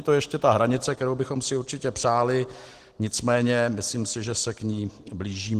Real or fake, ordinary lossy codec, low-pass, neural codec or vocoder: real; Opus, 16 kbps; 14.4 kHz; none